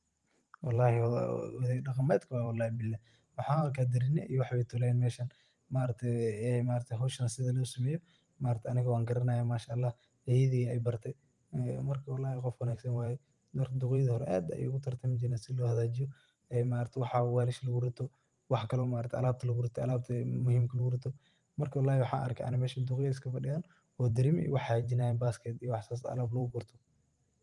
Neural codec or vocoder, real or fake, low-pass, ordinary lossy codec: vocoder, 44.1 kHz, 128 mel bands every 512 samples, BigVGAN v2; fake; 10.8 kHz; Opus, 32 kbps